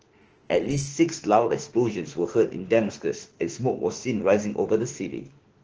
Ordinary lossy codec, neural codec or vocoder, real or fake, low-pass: Opus, 16 kbps; autoencoder, 48 kHz, 32 numbers a frame, DAC-VAE, trained on Japanese speech; fake; 7.2 kHz